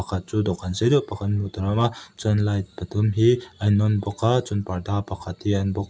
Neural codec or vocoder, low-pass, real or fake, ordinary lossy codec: none; none; real; none